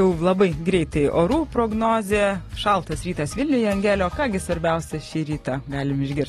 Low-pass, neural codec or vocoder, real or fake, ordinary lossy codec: 19.8 kHz; none; real; AAC, 32 kbps